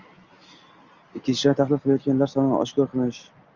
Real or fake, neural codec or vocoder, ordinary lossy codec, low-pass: real; none; Opus, 32 kbps; 7.2 kHz